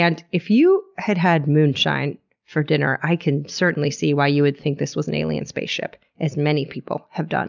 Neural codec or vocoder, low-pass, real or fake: none; 7.2 kHz; real